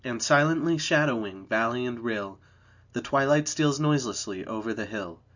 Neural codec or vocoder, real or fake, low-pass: none; real; 7.2 kHz